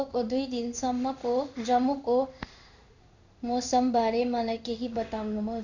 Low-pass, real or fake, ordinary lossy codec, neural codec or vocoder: 7.2 kHz; fake; none; codec, 16 kHz in and 24 kHz out, 1 kbps, XY-Tokenizer